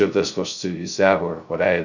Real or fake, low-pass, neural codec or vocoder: fake; 7.2 kHz; codec, 16 kHz, 0.2 kbps, FocalCodec